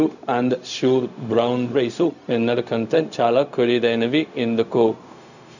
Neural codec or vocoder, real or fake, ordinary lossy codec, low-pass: codec, 16 kHz, 0.4 kbps, LongCat-Audio-Codec; fake; none; 7.2 kHz